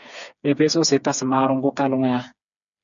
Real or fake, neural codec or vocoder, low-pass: fake; codec, 16 kHz, 4 kbps, FreqCodec, smaller model; 7.2 kHz